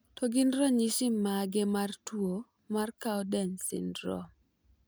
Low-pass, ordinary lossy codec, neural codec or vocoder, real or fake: none; none; none; real